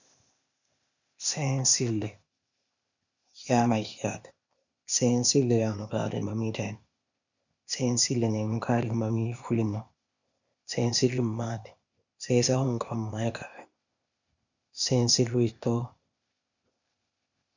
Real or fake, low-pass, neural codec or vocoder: fake; 7.2 kHz; codec, 16 kHz, 0.8 kbps, ZipCodec